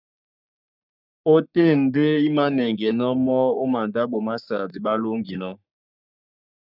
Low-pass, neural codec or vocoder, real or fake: 5.4 kHz; codec, 44.1 kHz, 3.4 kbps, Pupu-Codec; fake